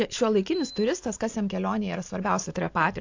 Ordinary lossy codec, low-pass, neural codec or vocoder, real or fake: AAC, 48 kbps; 7.2 kHz; none; real